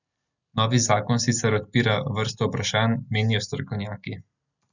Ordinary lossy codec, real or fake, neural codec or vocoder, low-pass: none; real; none; 7.2 kHz